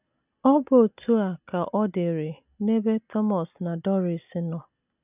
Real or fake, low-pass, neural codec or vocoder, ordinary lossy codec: real; 3.6 kHz; none; none